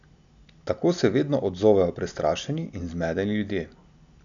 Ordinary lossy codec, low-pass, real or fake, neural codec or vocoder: none; 7.2 kHz; real; none